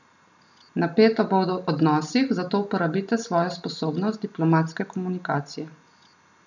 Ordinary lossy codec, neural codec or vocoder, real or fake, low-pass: none; none; real; 7.2 kHz